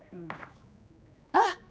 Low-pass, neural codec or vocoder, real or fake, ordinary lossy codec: none; codec, 16 kHz, 2 kbps, X-Codec, HuBERT features, trained on general audio; fake; none